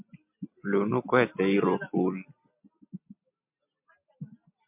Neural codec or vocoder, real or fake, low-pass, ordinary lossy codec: none; real; 3.6 kHz; MP3, 32 kbps